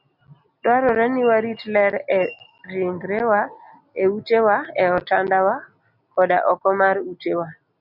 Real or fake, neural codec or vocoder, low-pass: real; none; 5.4 kHz